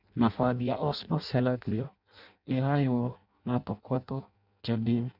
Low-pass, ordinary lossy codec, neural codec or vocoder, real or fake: 5.4 kHz; none; codec, 16 kHz in and 24 kHz out, 0.6 kbps, FireRedTTS-2 codec; fake